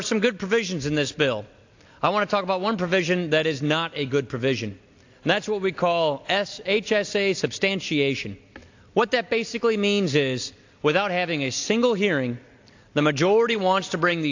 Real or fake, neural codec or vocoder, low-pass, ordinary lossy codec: real; none; 7.2 kHz; AAC, 48 kbps